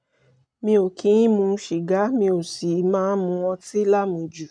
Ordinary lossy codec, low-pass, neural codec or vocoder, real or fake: none; 9.9 kHz; none; real